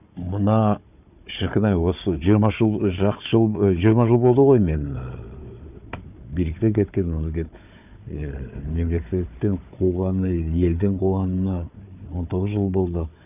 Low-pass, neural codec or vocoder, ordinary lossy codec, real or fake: 3.6 kHz; codec, 16 kHz, 4 kbps, FunCodec, trained on Chinese and English, 50 frames a second; none; fake